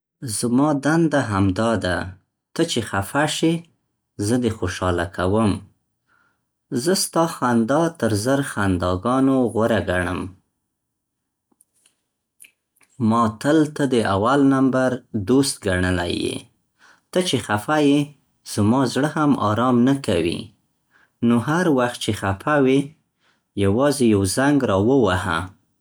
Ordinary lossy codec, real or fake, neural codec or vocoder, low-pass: none; real; none; none